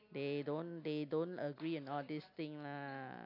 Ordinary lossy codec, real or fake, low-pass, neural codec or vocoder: AAC, 48 kbps; real; 5.4 kHz; none